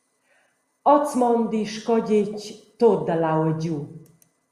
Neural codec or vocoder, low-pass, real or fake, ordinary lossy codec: none; 14.4 kHz; real; Opus, 64 kbps